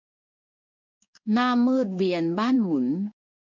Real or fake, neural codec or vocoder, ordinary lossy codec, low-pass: fake; codec, 16 kHz in and 24 kHz out, 1 kbps, XY-Tokenizer; MP3, 64 kbps; 7.2 kHz